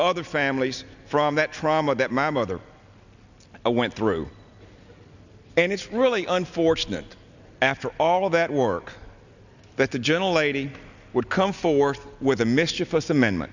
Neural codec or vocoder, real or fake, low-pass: none; real; 7.2 kHz